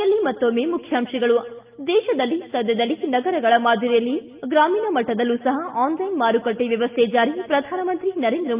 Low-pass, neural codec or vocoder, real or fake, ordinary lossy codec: 3.6 kHz; none; real; Opus, 24 kbps